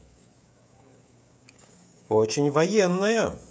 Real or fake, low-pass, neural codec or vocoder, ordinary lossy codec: fake; none; codec, 16 kHz, 16 kbps, FreqCodec, smaller model; none